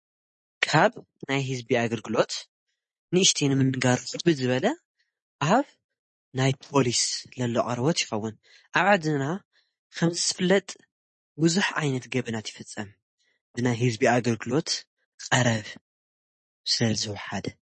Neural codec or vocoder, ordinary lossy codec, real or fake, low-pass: none; MP3, 32 kbps; real; 10.8 kHz